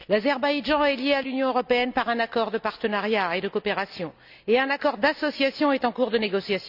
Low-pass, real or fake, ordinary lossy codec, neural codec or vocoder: 5.4 kHz; real; none; none